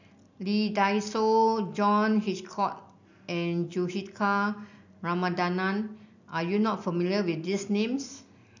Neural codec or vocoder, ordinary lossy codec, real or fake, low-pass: none; none; real; 7.2 kHz